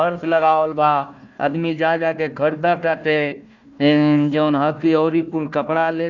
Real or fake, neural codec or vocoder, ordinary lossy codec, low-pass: fake; codec, 16 kHz, 1 kbps, FunCodec, trained on Chinese and English, 50 frames a second; none; 7.2 kHz